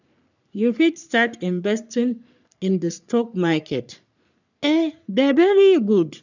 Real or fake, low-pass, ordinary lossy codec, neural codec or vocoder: fake; 7.2 kHz; none; codec, 44.1 kHz, 3.4 kbps, Pupu-Codec